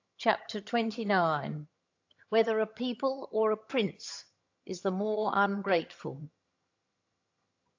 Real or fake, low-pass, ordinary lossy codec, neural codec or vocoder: fake; 7.2 kHz; AAC, 48 kbps; vocoder, 22.05 kHz, 80 mel bands, HiFi-GAN